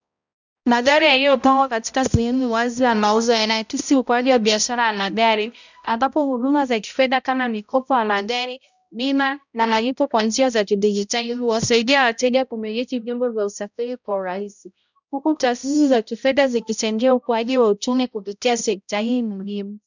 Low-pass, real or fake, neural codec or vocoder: 7.2 kHz; fake; codec, 16 kHz, 0.5 kbps, X-Codec, HuBERT features, trained on balanced general audio